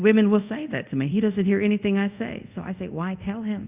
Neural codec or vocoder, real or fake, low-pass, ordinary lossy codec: codec, 24 kHz, 0.5 kbps, DualCodec; fake; 3.6 kHz; Opus, 64 kbps